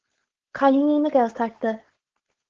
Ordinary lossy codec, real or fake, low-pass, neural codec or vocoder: Opus, 16 kbps; fake; 7.2 kHz; codec, 16 kHz, 4.8 kbps, FACodec